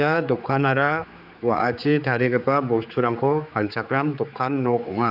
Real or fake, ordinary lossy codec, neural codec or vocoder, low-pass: fake; none; codec, 16 kHz, 4 kbps, X-Codec, HuBERT features, trained on general audio; 5.4 kHz